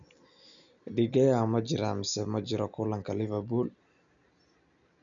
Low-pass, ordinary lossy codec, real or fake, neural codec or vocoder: 7.2 kHz; none; real; none